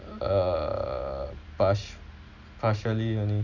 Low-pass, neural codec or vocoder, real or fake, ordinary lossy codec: 7.2 kHz; none; real; none